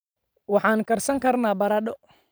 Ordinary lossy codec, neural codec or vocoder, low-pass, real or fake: none; vocoder, 44.1 kHz, 128 mel bands every 512 samples, BigVGAN v2; none; fake